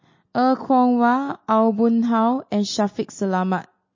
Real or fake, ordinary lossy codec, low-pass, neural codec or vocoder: real; MP3, 32 kbps; 7.2 kHz; none